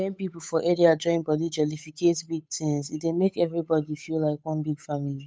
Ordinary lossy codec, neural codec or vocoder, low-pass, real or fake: none; codec, 16 kHz, 8 kbps, FunCodec, trained on Chinese and English, 25 frames a second; none; fake